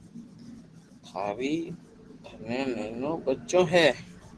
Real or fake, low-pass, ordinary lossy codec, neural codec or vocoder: real; 10.8 kHz; Opus, 16 kbps; none